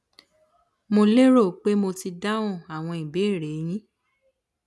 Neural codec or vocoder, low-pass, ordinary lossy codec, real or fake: none; none; none; real